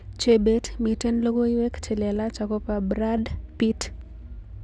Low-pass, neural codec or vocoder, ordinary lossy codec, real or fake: none; none; none; real